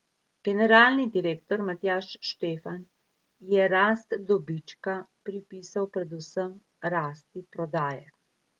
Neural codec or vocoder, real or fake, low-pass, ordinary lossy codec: none; real; 19.8 kHz; Opus, 24 kbps